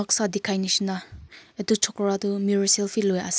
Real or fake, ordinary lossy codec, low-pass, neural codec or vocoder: real; none; none; none